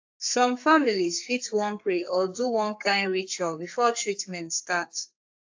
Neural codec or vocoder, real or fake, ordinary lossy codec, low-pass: codec, 32 kHz, 1.9 kbps, SNAC; fake; AAC, 48 kbps; 7.2 kHz